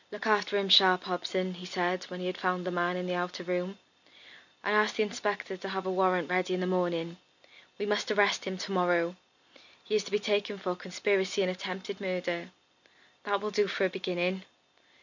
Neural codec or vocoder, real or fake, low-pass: none; real; 7.2 kHz